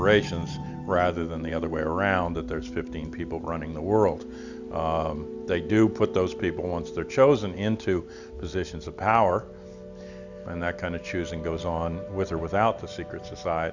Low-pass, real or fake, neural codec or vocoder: 7.2 kHz; real; none